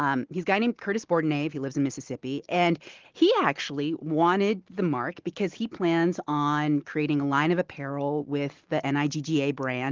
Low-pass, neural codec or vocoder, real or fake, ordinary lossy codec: 7.2 kHz; none; real; Opus, 16 kbps